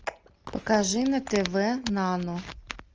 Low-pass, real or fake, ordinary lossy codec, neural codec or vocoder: 7.2 kHz; real; Opus, 24 kbps; none